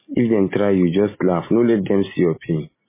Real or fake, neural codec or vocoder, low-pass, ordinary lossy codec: real; none; 3.6 kHz; MP3, 16 kbps